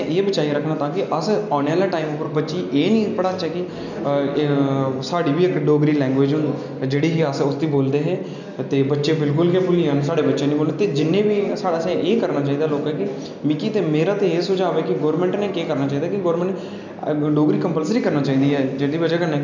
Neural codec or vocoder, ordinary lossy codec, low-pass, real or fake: none; none; 7.2 kHz; real